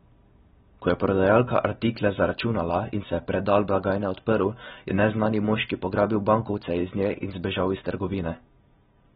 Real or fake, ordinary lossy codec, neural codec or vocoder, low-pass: real; AAC, 16 kbps; none; 19.8 kHz